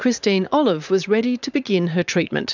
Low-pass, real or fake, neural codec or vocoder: 7.2 kHz; fake; vocoder, 44.1 kHz, 80 mel bands, Vocos